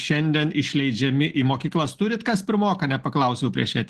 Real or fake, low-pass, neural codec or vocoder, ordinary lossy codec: real; 14.4 kHz; none; Opus, 16 kbps